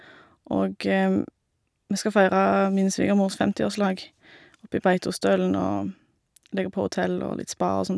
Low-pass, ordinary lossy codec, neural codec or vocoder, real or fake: none; none; none; real